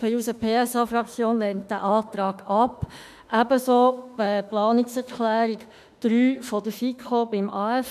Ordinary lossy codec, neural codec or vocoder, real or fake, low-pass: none; autoencoder, 48 kHz, 32 numbers a frame, DAC-VAE, trained on Japanese speech; fake; 14.4 kHz